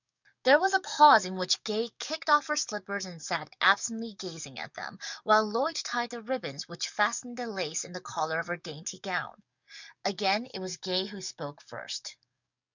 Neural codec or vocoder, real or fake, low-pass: codec, 44.1 kHz, 7.8 kbps, DAC; fake; 7.2 kHz